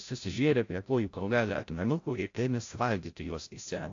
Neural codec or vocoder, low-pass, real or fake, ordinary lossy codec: codec, 16 kHz, 0.5 kbps, FreqCodec, larger model; 7.2 kHz; fake; AAC, 48 kbps